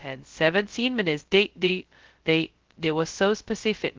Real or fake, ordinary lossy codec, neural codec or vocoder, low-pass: fake; Opus, 16 kbps; codec, 16 kHz, 0.2 kbps, FocalCodec; 7.2 kHz